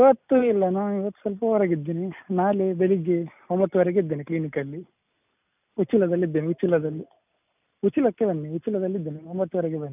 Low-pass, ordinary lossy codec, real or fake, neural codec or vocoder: 3.6 kHz; none; real; none